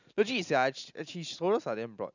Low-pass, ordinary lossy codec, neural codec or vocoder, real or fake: 7.2 kHz; none; none; real